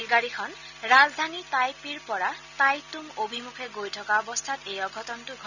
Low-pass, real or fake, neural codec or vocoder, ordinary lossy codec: 7.2 kHz; real; none; none